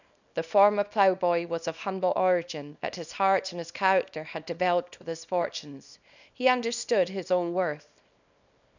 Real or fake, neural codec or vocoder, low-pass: fake; codec, 24 kHz, 0.9 kbps, WavTokenizer, small release; 7.2 kHz